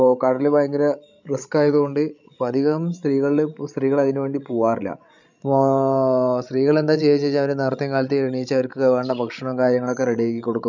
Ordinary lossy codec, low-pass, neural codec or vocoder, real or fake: none; 7.2 kHz; codec, 16 kHz, 16 kbps, FreqCodec, larger model; fake